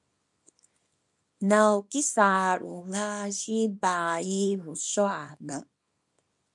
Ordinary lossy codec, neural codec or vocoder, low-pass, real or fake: MP3, 64 kbps; codec, 24 kHz, 0.9 kbps, WavTokenizer, small release; 10.8 kHz; fake